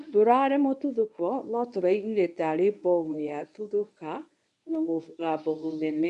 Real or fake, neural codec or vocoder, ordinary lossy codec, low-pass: fake; codec, 24 kHz, 0.9 kbps, WavTokenizer, medium speech release version 1; none; 10.8 kHz